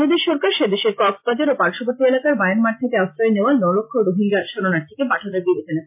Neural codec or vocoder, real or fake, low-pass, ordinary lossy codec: none; real; 3.6 kHz; MP3, 32 kbps